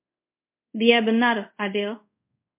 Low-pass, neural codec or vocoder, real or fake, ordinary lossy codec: 3.6 kHz; codec, 24 kHz, 0.5 kbps, DualCodec; fake; MP3, 32 kbps